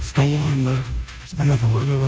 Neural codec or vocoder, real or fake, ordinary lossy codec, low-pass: codec, 16 kHz, 0.5 kbps, FunCodec, trained on Chinese and English, 25 frames a second; fake; none; none